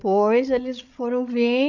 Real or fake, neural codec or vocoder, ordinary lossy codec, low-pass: fake; codec, 16 kHz, 8 kbps, FreqCodec, larger model; none; 7.2 kHz